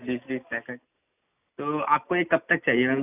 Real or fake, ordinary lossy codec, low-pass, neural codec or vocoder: real; none; 3.6 kHz; none